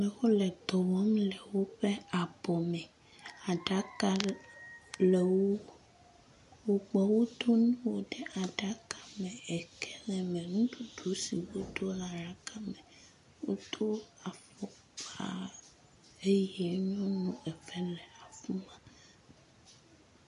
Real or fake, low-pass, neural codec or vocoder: real; 10.8 kHz; none